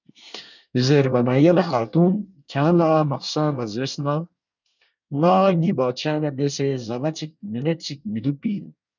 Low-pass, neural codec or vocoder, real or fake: 7.2 kHz; codec, 24 kHz, 1 kbps, SNAC; fake